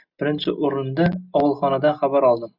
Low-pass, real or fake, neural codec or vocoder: 5.4 kHz; real; none